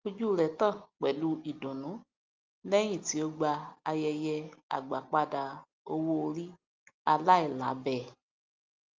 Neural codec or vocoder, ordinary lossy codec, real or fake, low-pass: none; Opus, 32 kbps; real; 7.2 kHz